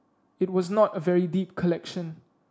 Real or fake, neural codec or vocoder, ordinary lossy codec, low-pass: real; none; none; none